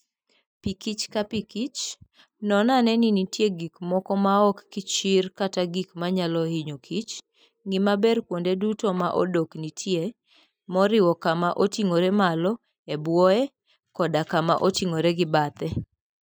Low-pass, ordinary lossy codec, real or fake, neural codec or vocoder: none; none; real; none